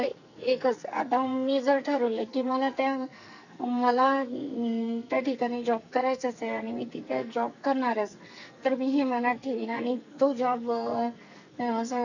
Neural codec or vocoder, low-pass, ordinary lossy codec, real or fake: codec, 44.1 kHz, 2.6 kbps, SNAC; 7.2 kHz; none; fake